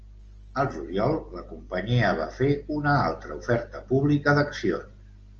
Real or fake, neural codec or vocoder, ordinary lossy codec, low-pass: real; none; Opus, 24 kbps; 7.2 kHz